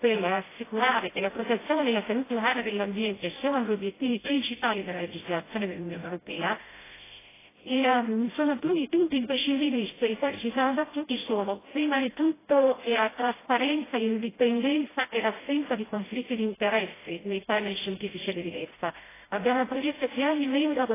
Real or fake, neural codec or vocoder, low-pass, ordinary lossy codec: fake; codec, 16 kHz, 0.5 kbps, FreqCodec, smaller model; 3.6 kHz; AAC, 16 kbps